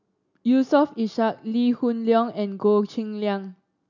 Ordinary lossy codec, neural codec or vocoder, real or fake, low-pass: none; none; real; 7.2 kHz